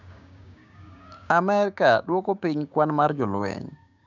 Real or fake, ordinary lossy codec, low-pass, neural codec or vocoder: fake; none; 7.2 kHz; codec, 16 kHz, 6 kbps, DAC